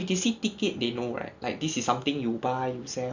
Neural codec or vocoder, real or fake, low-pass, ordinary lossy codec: none; real; 7.2 kHz; Opus, 64 kbps